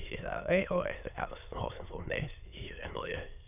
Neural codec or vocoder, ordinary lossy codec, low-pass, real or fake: autoencoder, 22.05 kHz, a latent of 192 numbers a frame, VITS, trained on many speakers; none; 3.6 kHz; fake